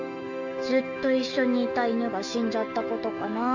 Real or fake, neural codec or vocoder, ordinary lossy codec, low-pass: fake; autoencoder, 48 kHz, 128 numbers a frame, DAC-VAE, trained on Japanese speech; none; 7.2 kHz